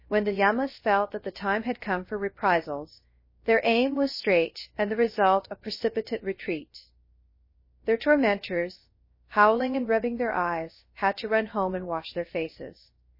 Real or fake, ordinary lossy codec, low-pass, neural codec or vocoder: fake; MP3, 24 kbps; 5.4 kHz; codec, 16 kHz, 0.3 kbps, FocalCodec